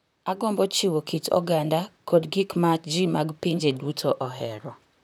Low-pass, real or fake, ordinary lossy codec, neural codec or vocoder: none; fake; none; vocoder, 44.1 kHz, 128 mel bands, Pupu-Vocoder